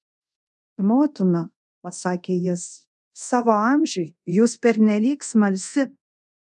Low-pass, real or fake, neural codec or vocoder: 10.8 kHz; fake; codec, 24 kHz, 0.5 kbps, DualCodec